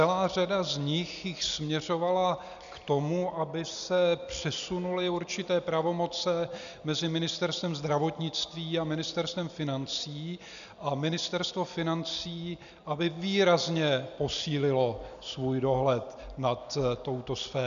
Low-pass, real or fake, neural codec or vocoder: 7.2 kHz; real; none